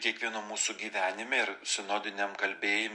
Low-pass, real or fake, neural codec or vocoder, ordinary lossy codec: 10.8 kHz; real; none; MP3, 48 kbps